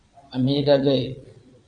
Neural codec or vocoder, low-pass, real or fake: vocoder, 22.05 kHz, 80 mel bands, Vocos; 9.9 kHz; fake